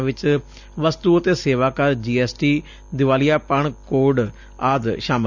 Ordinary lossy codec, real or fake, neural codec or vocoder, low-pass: none; real; none; 7.2 kHz